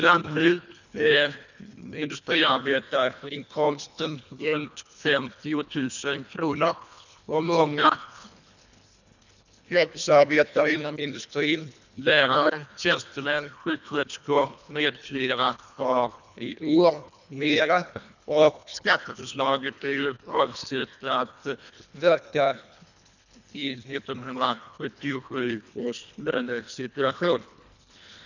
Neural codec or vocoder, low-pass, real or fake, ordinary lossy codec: codec, 24 kHz, 1.5 kbps, HILCodec; 7.2 kHz; fake; none